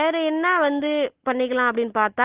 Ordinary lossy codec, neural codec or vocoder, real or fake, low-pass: Opus, 16 kbps; none; real; 3.6 kHz